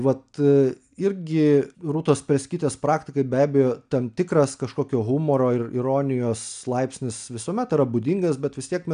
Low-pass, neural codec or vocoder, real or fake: 9.9 kHz; none; real